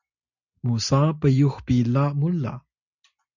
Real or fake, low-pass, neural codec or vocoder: real; 7.2 kHz; none